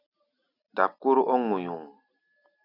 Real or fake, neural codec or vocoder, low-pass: real; none; 5.4 kHz